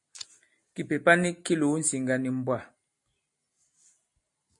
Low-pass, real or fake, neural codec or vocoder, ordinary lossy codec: 10.8 kHz; real; none; MP3, 48 kbps